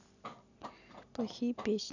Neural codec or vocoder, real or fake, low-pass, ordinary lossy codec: none; real; 7.2 kHz; none